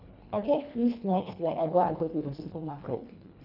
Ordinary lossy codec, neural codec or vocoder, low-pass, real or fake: none; codec, 24 kHz, 1.5 kbps, HILCodec; 5.4 kHz; fake